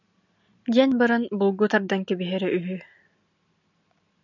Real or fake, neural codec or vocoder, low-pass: real; none; 7.2 kHz